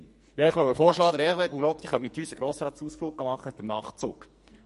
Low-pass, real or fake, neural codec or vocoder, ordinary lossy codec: 14.4 kHz; fake; codec, 44.1 kHz, 2.6 kbps, SNAC; MP3, 48 kbps